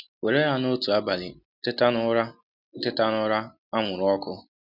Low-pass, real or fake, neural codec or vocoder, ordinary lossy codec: 5.4 kHz; real; none; none